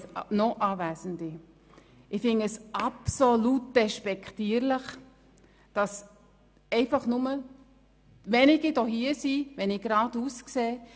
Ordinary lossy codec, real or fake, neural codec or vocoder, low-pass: none; real; none; none